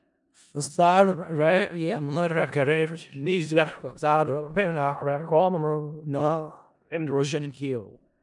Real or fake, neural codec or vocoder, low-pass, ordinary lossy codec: fake; codec, 16 kHz in and 24 kHz out, 0.4 kbps, LongCat-Audio-Codec, four codebook decoder; 10.8 kHz; MP3, 96 kbps